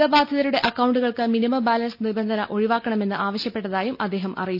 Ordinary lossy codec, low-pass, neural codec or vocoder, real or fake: none; 5.4 kHz; none; real